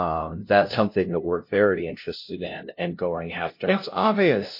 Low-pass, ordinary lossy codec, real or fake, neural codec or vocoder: 5.4 kHz; MP3, 32 kbps; fake; codec, 16 kHz, 0.5 kbps, FunCodec, trained on LibriTTS, 25 frames a second